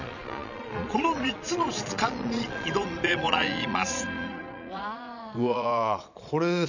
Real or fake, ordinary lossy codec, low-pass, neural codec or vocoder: fake; none; 7.2 kHz; vocoder, 22.05 kHz, 80 mel bands, Vocos